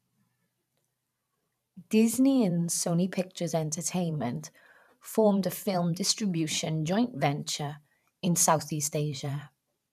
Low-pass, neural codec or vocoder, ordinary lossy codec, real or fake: 14.4 kHz; vocoder, 44.1 kHz, 128 mel bands every 512 samples, BigVGAN v2; none; fake